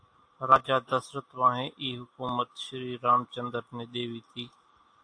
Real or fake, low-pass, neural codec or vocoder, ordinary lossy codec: real; 9.9 kHz; none; AAC, 48 kbps